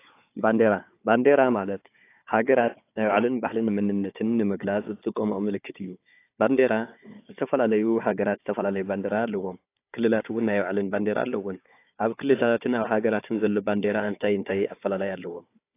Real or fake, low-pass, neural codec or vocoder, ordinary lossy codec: fake; 3.6 kHz; codec, 16 kHz, 4 kbps, FunCodec, trained on Chinese and English, 50 frames a second; AAC, 24 kbps